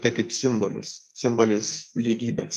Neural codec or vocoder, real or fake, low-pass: codec, 44.1 kHz, 2.6 kbps, SNAC; fake; 14.4 kHz